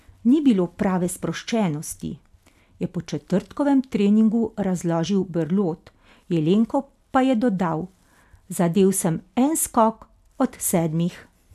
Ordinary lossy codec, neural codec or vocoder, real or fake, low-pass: none; none; real; 14.4 kHz